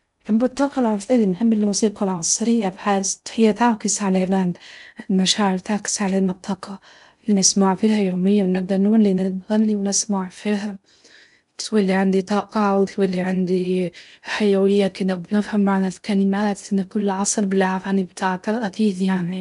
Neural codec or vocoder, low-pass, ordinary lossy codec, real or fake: codec, 16 kHz in and 24 kHz out, 0.6 kbps, FocalCodec, streaming, 2048 codes; 10.8 kHz; none; fake